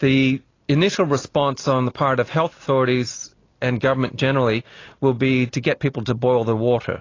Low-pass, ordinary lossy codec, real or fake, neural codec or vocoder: 7.2 kHz; AAC, 32 kbps; real; none